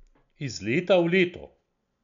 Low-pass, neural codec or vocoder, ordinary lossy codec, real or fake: 7.2 kHz; none; none; real